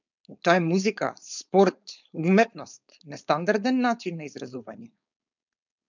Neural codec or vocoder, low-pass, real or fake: codec, 16 kHz, 4.8 kbps, FACodec; 7.2 kHz; fake